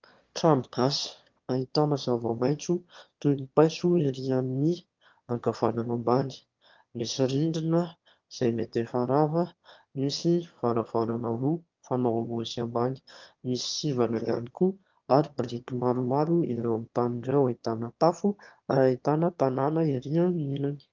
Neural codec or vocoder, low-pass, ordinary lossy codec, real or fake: autoencoder, 22.05 kHz, a latent of 192 numbers a frame, VITS, trained on one speaker; 7.2 kHz; Opus, 32 kbps; fake